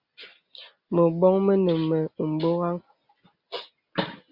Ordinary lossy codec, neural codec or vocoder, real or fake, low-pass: Opus, 64 kbps; none; real; 5.4 kHz